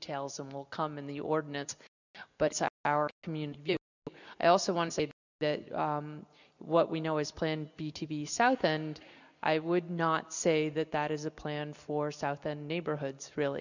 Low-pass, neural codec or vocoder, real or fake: 7.2 kHz; none; real